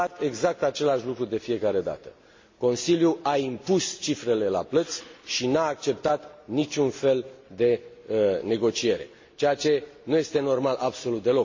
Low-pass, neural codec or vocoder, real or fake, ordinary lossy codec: 7.2 kHz; none; real; MP3, 32 kbps